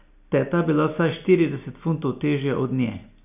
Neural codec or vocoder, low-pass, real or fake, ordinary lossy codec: none; 3.6 kHz; real; none